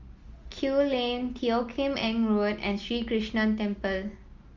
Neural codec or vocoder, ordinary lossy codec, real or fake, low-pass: none; Opus, 32 kbps; real; 7.2 kHz